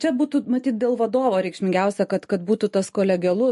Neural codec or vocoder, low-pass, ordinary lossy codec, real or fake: none; 14.4 kHz; MP3, 48 kbps; real